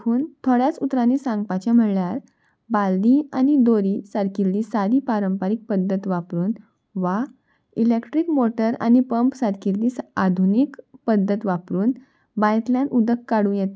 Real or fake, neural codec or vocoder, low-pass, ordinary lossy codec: real; none; none; none